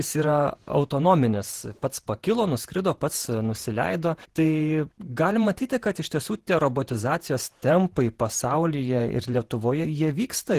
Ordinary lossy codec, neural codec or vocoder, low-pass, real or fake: Opus, 16 kbps; vocoder, 48 kHz, 128 mel bands, Vocos; 14.4 kHz; fake